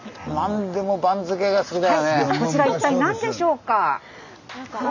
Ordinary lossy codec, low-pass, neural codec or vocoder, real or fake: none; 7.2 kHz; none; real